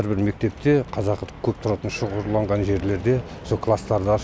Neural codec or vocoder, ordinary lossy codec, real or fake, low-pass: none; none; real; none